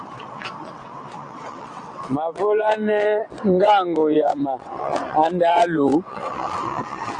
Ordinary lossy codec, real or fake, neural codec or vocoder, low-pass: AAC, 48 kbps; fake; vocoder, 22.05 kHz, 80 mel bands, WaveNeXt; 9.9 kHz